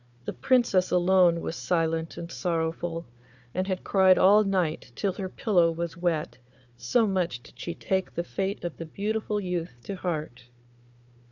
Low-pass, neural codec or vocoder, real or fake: 7.2 kHz; codec, 16 kHz, 4 kbps, FunCodec, trained on Chinese and English, 50 frames a second; fake